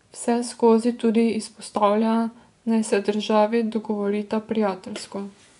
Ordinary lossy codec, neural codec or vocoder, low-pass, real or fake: none; vocoder, 24 kHz, 100 mel bands, Vocos; 10.8 kHz; fake